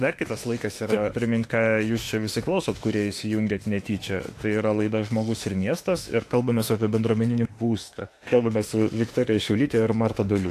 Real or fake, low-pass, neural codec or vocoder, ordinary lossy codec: fake; 14.4 kHz; autoencoder, 48 kHz, 32 numbers a frame, DAC-VAE, trained on Japanese speech; AAC, 64 kbps